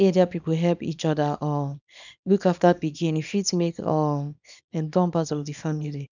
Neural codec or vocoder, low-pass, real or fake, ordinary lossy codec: codec, 24 kHz, 0.9 kbps, WavTokenizer, small release; 7.2 kHz; fake; none